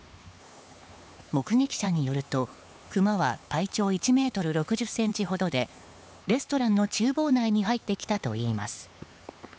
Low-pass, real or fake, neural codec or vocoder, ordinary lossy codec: none; fake; codec, 16 kHz, 4 kbps, X-Codec, HuBERT features, trained on LibriSpeech; none